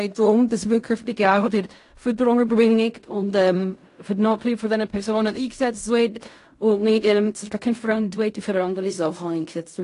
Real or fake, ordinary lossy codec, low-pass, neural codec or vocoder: fake; AAC, 48 kbps; 10.8 kHz; codec, 16 kHz in and 24 kHz out, 0.4 kbps, LongCat-Audio-Codec, fine tuned four codebook decoder